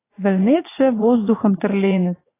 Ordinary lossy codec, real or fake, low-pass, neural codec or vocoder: AAC, 16 kbps; fake; 3.6 kHz; vocoder, 44.1 kHz, 80 mel bands, Vocos